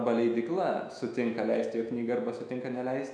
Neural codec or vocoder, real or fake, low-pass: autoencoder, 48 kHz, 128 numbers a frame, DAC-VAE, trained on Japanese speech; fake; 9.9 kHz